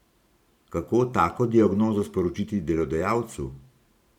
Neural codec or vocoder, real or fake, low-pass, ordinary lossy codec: vocoder, 44.1 kHz, 128 mel bands every 512 samples, BigVGAN v2; fake; 19.8 kHz; none